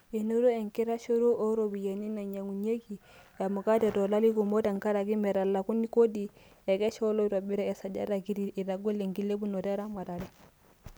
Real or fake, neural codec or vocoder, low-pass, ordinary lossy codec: real; none; none; none